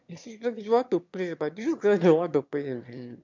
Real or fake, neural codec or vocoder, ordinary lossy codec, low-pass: fake; autoencoder, 22.05 kHz, a latent of 192 numbers a frame, VITS, trained on one speaker; MP3, 64 kbps; 7.2 kHz